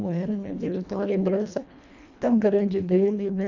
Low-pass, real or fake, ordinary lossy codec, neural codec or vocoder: 7.2 kHz; fake; none; codec, 24 kHz, 1.5 kbps, HILCodec